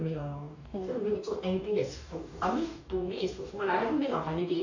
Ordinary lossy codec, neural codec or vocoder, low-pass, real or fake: none; codec, 44.1 kHz, 2.6 kbps, DAC; 7.2 kHz; fake